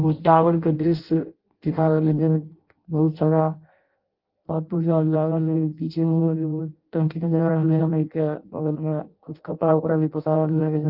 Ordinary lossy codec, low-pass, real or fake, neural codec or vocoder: Opus, 32 kbps; 5.4 kHz; fake; codec, 16 kHz in and 24 kHz out, 0.6 kbps, FireRedTTS-2 codec